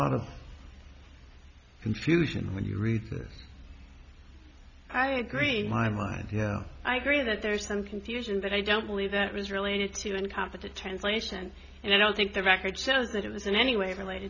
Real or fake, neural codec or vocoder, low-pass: real; none; 7.2 kHz